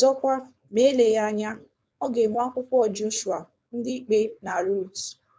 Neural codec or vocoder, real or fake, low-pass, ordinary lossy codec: codec, 16 kHz, 4.8 kbps, FACodec; fake; none; none